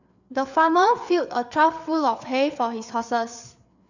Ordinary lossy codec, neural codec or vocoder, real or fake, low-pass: none; codec, 16 kHz, 4 kbps, FreqCodec, larger model; fake; 7.2 kHz